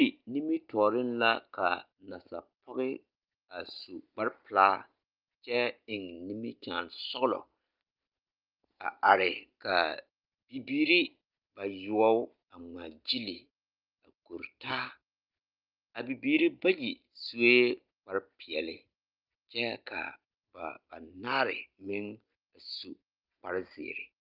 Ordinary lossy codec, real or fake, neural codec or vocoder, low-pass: Opus, 32 kbps; real; none; 5.4 kHz